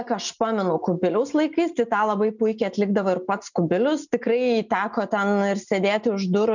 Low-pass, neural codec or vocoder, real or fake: 7.2 kHz; none; real